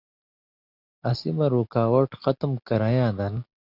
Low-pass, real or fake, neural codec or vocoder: 5.4 kHz; real; none